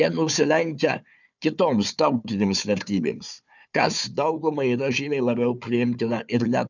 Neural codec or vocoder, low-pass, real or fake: codec, 16 kHz, 4 kbps, FunCodec, trained on Chinese and English, 50 frames a second; 7.2 kHz; fake